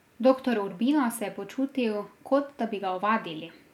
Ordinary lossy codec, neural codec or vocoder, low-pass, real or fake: MP3, 96 kbps; none; 19.8 kHz; real